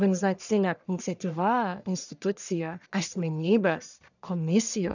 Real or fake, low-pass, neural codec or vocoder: fake; 7.2 kHz; codec, 44.1 kHz, 1.7 kbps, Pupu-Codec